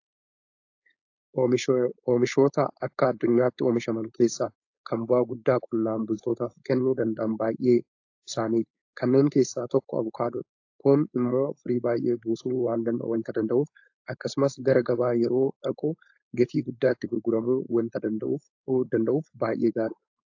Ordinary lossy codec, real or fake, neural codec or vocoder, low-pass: AAC, 48 kbps; fake; codec, 16 kHz, 4.8 kbps, FACodec; 7.2 kHz